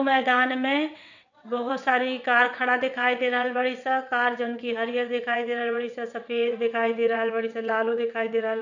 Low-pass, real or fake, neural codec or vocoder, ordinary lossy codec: 7.2 kHz; fake; vocoder, 22.05 kHz, 80 mel bands, WaveNeXt; none